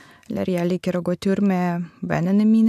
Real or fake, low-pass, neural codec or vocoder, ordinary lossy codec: real; 14.4 kHz; none; AAC, 96 kbps